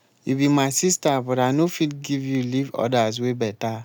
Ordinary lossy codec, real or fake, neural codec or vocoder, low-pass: none; real; none; none